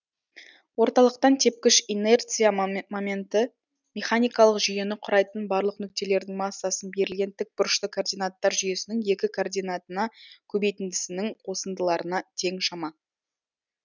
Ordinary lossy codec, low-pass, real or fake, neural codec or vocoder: none; 7.2 kHz; real; none